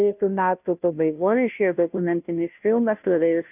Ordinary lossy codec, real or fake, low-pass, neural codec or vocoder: AAC, 32 kbps; fake; 3.6 kHz; codec, 16 kHz, 0.5 kbps, FunCodec, trained on Chinese and English, 25 frames a second